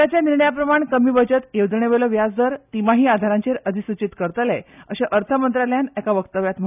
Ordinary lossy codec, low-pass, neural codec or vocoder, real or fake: none; 3.6 kHz; none; real